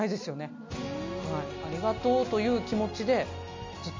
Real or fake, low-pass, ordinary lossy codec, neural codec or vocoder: real; 7.2 kHz; MP3, 48 kbps; none